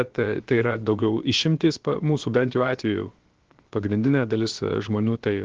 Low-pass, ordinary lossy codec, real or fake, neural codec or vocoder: 7.2 kHz; Opus, 16 kbps; fake; codec, 16 kHz, about 1 kbps, DyCAST, with the encoder's durations